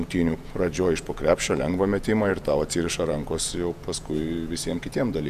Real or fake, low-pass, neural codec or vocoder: fake; 14.4 kHz; vocoder, 48 kHz, 128 mel bands, Vocos